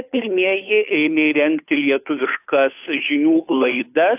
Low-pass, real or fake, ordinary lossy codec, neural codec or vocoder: 3.6 kHz; fake; AAC, 24 kbps; codec, 16 kHz, 2 kbps, FunCodec, trained on Chinese and English, 25 frames a second